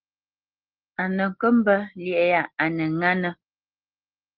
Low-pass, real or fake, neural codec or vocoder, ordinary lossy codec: 5.4 kHz; real; none; Opus, 16 kbps